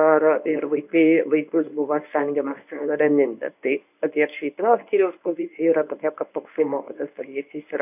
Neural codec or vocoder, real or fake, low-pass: codec, 24 kHz, 0.9 kbps, WavTokenizer, medium speech release version 1; fake; 3.6 kHz